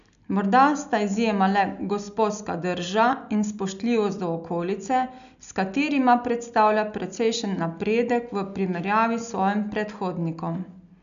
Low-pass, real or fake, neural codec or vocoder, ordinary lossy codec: 7.2 kHz; real; none; none